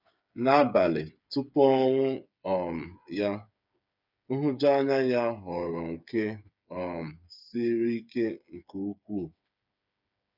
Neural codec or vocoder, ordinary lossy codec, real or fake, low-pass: codec, 16 kHz, 8 kbps, FreqCodec, smaller model; none; fake; 5.4 kHz